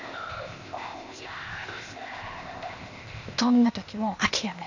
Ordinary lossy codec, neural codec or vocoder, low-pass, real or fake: none; codec, 16 kHz, 0.8 kbps, ZipCodec; 7.2 kHz; fake